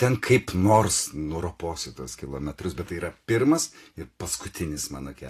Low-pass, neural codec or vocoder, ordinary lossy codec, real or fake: 14.4 kHz; none; AAC, 48 kbps; real